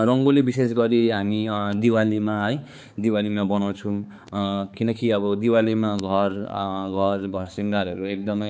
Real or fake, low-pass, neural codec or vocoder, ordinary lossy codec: fake; none; codec, 16 kHz, 4 kbps, X-Codec, HuBERT features, trained on balanced general audio; none